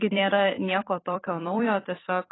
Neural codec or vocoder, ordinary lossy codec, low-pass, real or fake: vocoder, 44.1 kHz, 128 mel bands every 256 samples, BigVGAN v2; AAC, 16 kbps; 7.2 kHz; fake